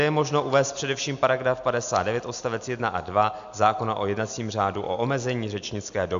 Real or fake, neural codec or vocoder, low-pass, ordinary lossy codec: real; none; 7.2 kHz; AAC, 96 kbps